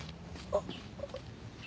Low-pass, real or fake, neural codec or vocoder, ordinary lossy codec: none; real; none; none